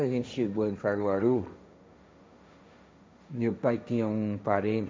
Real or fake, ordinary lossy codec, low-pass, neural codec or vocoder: fake; none; none; codec, 16 kHz, 1.1 kbps, Voila-Tokenizer